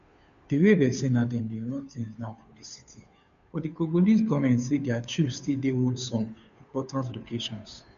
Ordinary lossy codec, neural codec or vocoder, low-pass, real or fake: none; codec, 16 kHz, 2 kbps, FunCodec, trained on Chinese and English, 25 frames a second; 7.2 kHz; fake